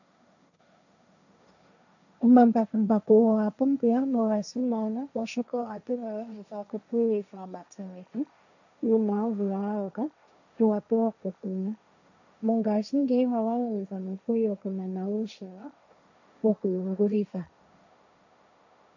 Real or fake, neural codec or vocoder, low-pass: fake; codec, 16 kHz, 1.1 kbps, Voila-Tokenizer; 7.2 kHz